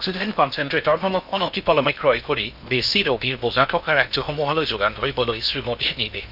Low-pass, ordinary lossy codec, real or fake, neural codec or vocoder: 5.4 kHz; none; fake; codec, 16 kHz in and 24 kHz out, 0.6 kbps, FocalCodec, streaming, 4096 codes